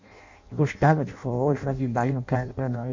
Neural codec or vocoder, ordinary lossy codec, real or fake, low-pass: codec, 16 kHz in and 24 kHz out, 0.6 kbps, FireRedTTS-2 codec; MP3, 48 kbps; fake; 7.2 kHz